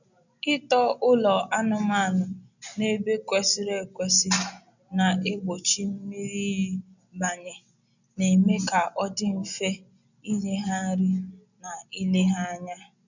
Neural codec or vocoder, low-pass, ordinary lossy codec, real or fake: none; 7.2 kHz; none; real